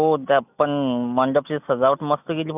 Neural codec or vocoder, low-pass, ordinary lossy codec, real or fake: none; 3.6 kHz; none; real